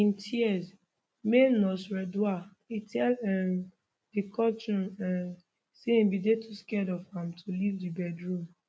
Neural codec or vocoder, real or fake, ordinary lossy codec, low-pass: none; real; none; none